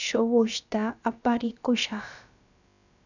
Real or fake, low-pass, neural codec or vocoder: fake; 7.2 kHz; codec, 16 kHz, about 1 kbps, DyCAST, with the encoder's durations